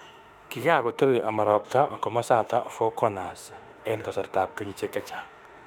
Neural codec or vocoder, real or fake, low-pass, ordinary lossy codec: autoencoder, 48 kHz, 32 numbers a frame, DAC-VAE, trained on Japanese speech; fake; 19.8 kHz; none